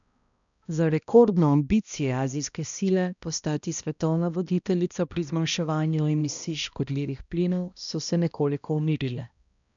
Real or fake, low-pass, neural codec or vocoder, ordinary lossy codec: fake; 7.2 kHz; codec, 16 kHz, 1 kbps, X-Codec, HuBERT features, trained on balanced general audio; none